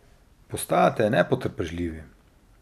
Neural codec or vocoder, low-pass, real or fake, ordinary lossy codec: none; 14.4 kHz; real; none